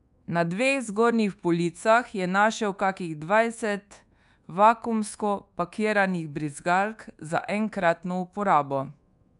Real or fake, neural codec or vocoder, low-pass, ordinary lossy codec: fake; codec, 24 kHz, 1.2 kbps, DualCodec; 10.8 kHz; MP3, 96 kbps